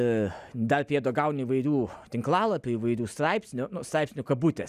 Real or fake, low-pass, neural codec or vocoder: real; 14.4 kHz; none